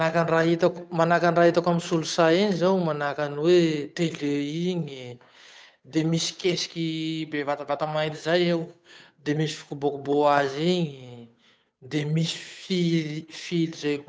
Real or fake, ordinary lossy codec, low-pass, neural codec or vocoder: fake; none; none; codec, 16 kHz, 8 kbps, FunCodec, trained on Chinese and English, 25 frames a second